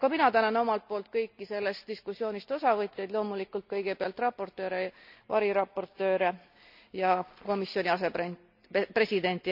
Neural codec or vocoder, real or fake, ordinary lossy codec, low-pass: none; real; none; 5.4 kHz